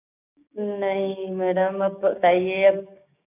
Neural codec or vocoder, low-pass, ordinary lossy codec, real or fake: codec, 16 kHz, 6 kbps, DAC; 3.6 kHz; none; fake